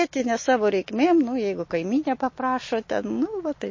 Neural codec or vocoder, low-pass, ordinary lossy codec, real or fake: none; 7.2 kHz; MP3, 32 kbps; real